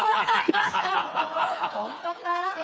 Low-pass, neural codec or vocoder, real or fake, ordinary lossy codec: none; codec, 16 kHz, 4 kbps, FreqCodec, smaller model; fake; none